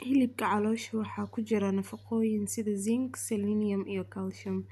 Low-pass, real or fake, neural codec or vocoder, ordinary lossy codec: 14.4 kHz; real; none; none